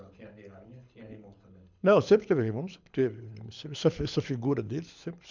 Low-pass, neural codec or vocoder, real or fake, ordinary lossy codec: 7.2 kHz; codec, 24 kHz, 6 kbps, HILCodec; fake; MP3, 64 kbps